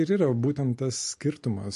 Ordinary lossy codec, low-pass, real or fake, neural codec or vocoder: MP3, 48 kbps; 14.4 kHz; real; none